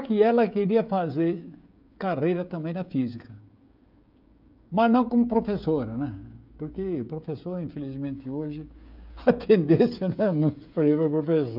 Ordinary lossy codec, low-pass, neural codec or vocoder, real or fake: none; 5.4 kHz; codec, 16 kHz, 16 kbps, FreqCodec, smaller model; fake